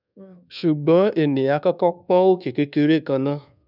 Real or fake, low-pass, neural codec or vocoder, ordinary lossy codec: fake; 5.4 kHz; codec, 24 kHz, 1.2 kbps, DualCodec; none